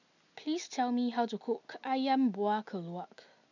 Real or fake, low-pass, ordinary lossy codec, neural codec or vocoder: real; 7.2 kHz; none; none